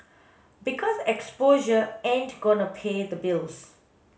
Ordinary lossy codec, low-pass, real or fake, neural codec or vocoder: none; none; real; none